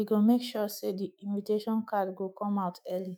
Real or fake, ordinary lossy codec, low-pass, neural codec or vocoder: fake; none; none; autoencoder, 48 kHz, 128 numbers a frame, DAC-VAE, trained on Japanese speech